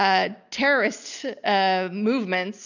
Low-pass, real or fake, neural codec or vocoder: 7.2 kHz; real; none